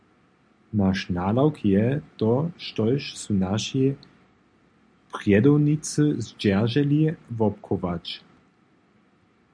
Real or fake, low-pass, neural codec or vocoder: real; 9.9 kHz; none